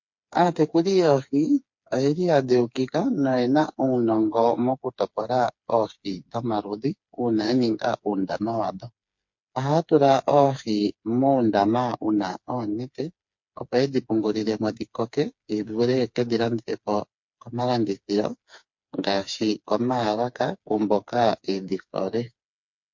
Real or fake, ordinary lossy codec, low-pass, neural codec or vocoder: fake; MP3, 48 kbps; 7.2 kHz; codec, 16 kHz, 4 kbps, FreqCodec, smaller model